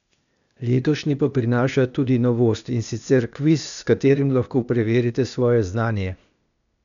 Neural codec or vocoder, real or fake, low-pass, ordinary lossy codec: codec, 16 kHz, 0.8 kbps, ZipCodec; fake; 7.2 kHz; none